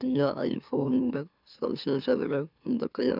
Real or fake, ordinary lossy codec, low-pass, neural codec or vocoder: fake; none; 5.4 kHz; autoencoder, 44.1 kHz, a latent of 192 numbers a frame, MeloTTS